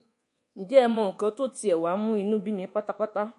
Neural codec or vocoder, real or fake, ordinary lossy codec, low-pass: codec, 24 kHz, 1.2 kbps, DualCodec; fake; MP3, 48 kbps; 10.8 kHz